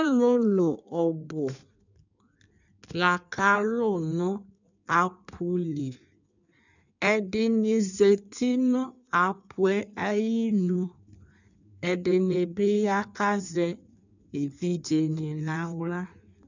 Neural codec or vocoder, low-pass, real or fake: codec, 16 kHz in and 24 kHz out, 1.1 kbps, FireRedTTS-2 codec; 7.2 kHz; fake